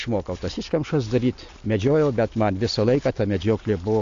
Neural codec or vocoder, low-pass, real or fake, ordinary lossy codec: none; 7.2 kHz; real; AAC, 64 kbps